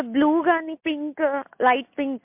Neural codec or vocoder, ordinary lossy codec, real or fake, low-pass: none; MP3, 32 kbps; real; 3.6 kHz